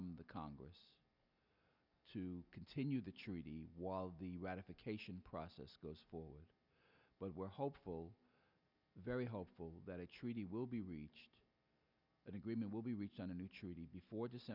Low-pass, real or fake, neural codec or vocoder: 5.4 kHz; real; none